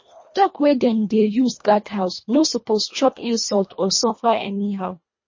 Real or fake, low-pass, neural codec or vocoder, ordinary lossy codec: fake; 7.2 kHz; codec, 24 kHz, 1.5 kbps, HILCodec; MP3, 32 kbps